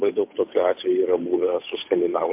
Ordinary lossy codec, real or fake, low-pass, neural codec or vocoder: MP3, 32 kbps; fake; 3.6 kHz; codec, 16 kHz, 8 kbps, FunCodec, trained on Chinese and English, 25 frames a second